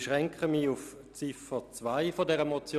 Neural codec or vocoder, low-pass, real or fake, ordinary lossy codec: none; 14.4 kHz; real; none